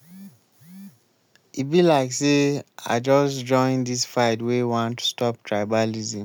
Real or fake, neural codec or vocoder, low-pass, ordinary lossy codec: real; none; none; none